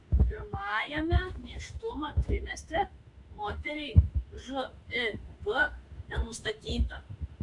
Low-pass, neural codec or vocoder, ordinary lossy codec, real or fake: 10.8 kHz; autoencoder, 48 kHz, 32 numbers a frame, DAC-VAE, trained on Japanese speech; MP3, 64 kbps; fake